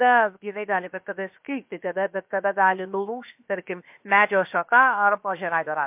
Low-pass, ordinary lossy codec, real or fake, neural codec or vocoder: 3.6 kHz; MP3, 32 kbps; fake; codec, 16 kHz, 0.7 kbps, FocalCodec